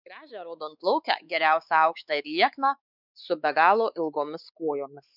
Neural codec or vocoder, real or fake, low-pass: codec, 16 kHz, 4 kbps, X-Codec, WavLM features, trained on Multilingual LibriSpeech; fake; 5.4 kHz